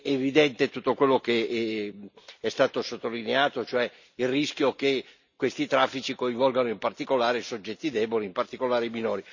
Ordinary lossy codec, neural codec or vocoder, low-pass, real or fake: none; none; 7.2 kHz; real